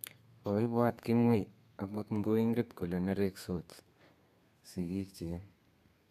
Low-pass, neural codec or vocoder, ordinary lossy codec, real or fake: 14.4 kHz; codec, 32 kHz, 1.9 kbps, SNAC; none; fake